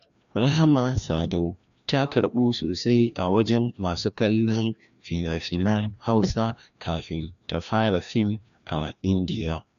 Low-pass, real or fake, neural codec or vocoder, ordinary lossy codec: 7.2 kHz; fake; codec, 16 kHz, 1 kbps, FreqCodec, larger model; none